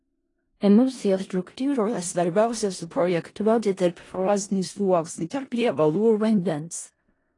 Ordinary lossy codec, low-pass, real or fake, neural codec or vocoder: AAC, 32 kbps; 10.8 kHz; fake; codec, 16 kHz in and 24 kHz out, 0.4 kbps, LongCat-Audio-Codec, four codebook decoder